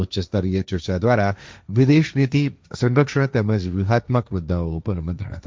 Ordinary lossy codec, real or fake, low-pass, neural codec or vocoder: none; fake; none; codec, 16 kHz, 1.1 kbps, Voila-Tokenizer